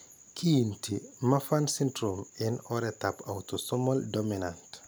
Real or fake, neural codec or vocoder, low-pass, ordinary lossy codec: real; none; none; none